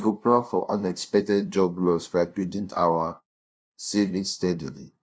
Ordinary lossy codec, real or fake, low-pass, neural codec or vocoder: none; fake; none; codec, 16 kHz, 0.5 kbps, FunCodec, trained on LibriTTS, 25 frames a second